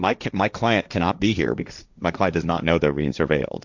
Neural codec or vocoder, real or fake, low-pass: codec, 16 kHz, 1.1 kbps, Voila-Tokenizer; fake; 7.2 kHz